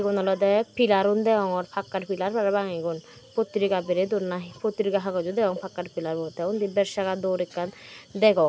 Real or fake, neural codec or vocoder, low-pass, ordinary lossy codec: real; none; none; none